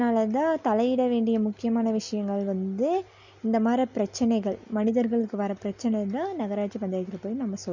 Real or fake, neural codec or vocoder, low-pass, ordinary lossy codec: real; none; 7.2 kHz; none